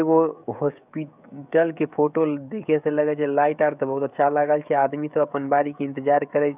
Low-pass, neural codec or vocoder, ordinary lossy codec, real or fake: 3.6 kHz; codec, 16 kHz, 16 kbps, FreqCodec, smaller model; none; fake